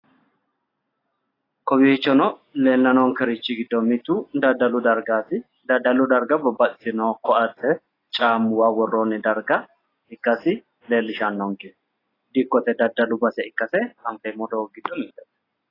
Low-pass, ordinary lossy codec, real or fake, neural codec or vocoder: 5.4 kHz; AAC, 24 kbps; real; none